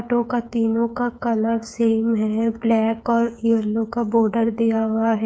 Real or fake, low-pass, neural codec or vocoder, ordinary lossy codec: fake; none; codec, 16 kHz, 8 kbps, FreqCodec, smaller model; none